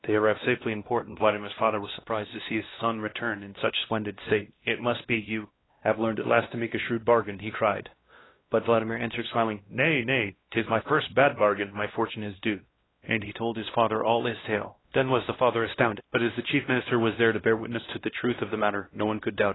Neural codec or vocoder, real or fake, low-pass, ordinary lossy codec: codec, 16 kHz, 1 kbps, X-Codec, WavLM features, trained on Multilingual LibriSpeech; fake; 7.2 kHz; AAC, 16 kbps